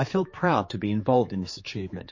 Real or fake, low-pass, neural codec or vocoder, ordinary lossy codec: fake; 7.2 kHz; codec, 16 kHz, 4 kbps, X-Codec, HuBERT features, trained on general audio; MP3, 32 kbps